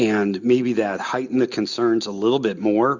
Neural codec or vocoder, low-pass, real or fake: none; 7.2 kHz; real